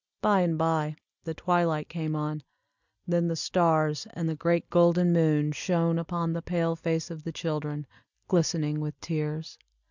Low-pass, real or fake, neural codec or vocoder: 7.2 kHz; real; none